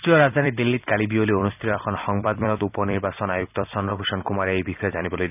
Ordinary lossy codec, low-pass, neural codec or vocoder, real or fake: none; 3.6 kHz; vocoder, 44.1 kHz, 128 mel bands every 256 samples, BigVGAN v2; fake